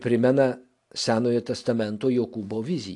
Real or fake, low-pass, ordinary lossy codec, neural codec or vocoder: real; 10.8 kHz; AAC, 64 kbps; none